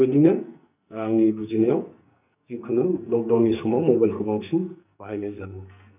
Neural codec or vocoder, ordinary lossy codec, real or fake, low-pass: codec, 44.1 kHz, 2.6 kbps, SNAC; none; fake; 3.6 kHz